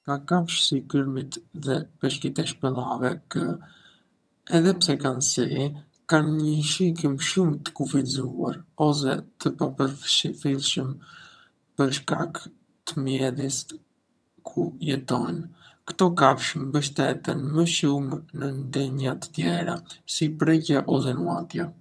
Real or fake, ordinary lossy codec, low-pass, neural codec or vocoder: fake; none; none; vocoder, 22.05 kHz, 80 mel bands, HiFi-GAN